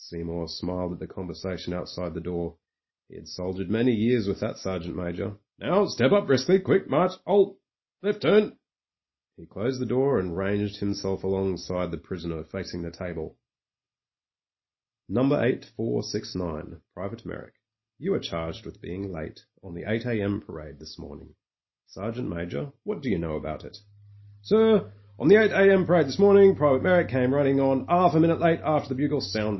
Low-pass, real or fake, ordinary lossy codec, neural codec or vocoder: 7.2 kHz; real; MP3, 24 kbps; none